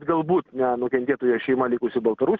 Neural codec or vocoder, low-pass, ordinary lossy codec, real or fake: none; 7.2 kHz; Opus, 16 kbps; real